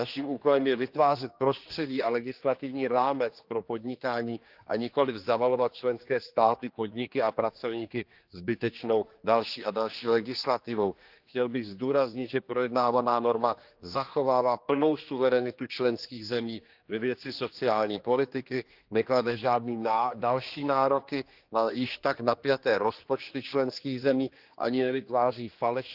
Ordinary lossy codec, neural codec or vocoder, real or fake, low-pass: Opus, 24 kbps; codec, 16 kHz, 2 kbps, X-Codec, HuBERT features, trained on general audio; fake; 5.4 kHz